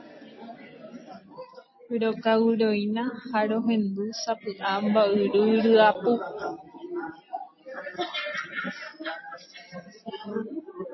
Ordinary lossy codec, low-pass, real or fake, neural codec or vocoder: MP3, 24 kbps; 7.2 kHz; real; none